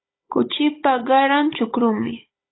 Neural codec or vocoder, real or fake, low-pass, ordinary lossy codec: codec, 16 kHz, 16 kbps, FunCodec, trained on Chinese and English, 50 frames a second; fake; 7.2 kHz; AAC, 16 kbps